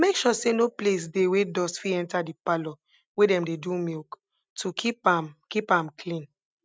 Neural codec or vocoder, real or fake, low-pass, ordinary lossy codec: none; real; none; none